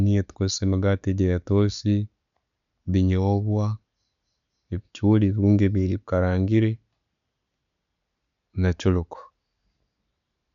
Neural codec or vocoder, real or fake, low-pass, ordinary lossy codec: none; real; 7.2 kHz; none